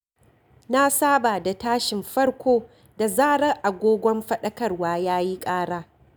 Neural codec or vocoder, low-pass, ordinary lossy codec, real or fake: none; none; none; real